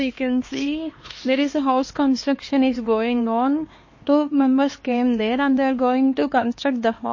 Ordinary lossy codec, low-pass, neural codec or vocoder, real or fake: MP3, 32 kbps; 7.2 kHz; codec, 16 kHz, 2 kbps, X-Codec, HuBERT features, trained on LibriSpeech; fake